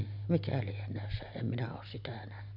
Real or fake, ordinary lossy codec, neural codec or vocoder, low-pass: real; none; none; 5.4 kHz